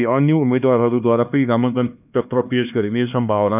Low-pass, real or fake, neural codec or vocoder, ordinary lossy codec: 3.6 kHz; fake; codec, 16 kHz, 2 kbps, X-Codec, HuBERT features, trained on LibriSpeech; none